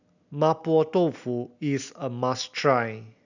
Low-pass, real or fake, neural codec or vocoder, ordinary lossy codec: 7.2 kHz; real; none; none